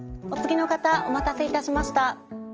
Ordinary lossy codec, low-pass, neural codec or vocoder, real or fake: Opus, 24 kbps; 7.2 kHz; none; real